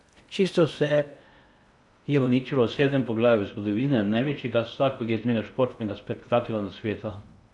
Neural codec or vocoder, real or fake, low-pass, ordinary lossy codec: codec, 16 kHz in and 24 kHz out, 0.6 kbps, FocalCodec, streaming, 2048 codes; fake; 10.8 kHz; none